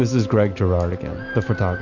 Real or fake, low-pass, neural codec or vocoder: real; 7.2 kHz; none